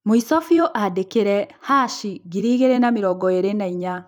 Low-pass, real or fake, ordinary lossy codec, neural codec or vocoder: 19.8 kHz; fake; none; vocoder, 44.1 kHz, 128 mel bands every 256 samples, BigVGAN v2